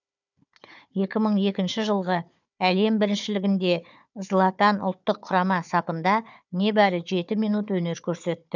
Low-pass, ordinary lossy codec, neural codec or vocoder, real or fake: 7.2 kHz; none; codec, 16 kHz, 4 kbps, FunCodec, trained on Chinese and English, 50 frames a second; fake